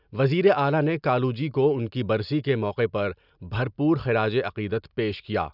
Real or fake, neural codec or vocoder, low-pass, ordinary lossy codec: real; none; 5.4 kHz; none